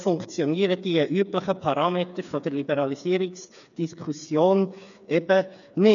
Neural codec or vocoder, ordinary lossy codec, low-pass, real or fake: codec, 16 kHz, 4 kbps, FreqCodec, smaller model; none; 7.2 kHz; fake